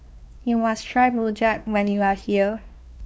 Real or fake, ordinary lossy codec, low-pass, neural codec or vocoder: fake; none; none; codec, 16 kHz, 2 kbps, X-Codec, WavLM features, trained on Multilingual LibriSpeech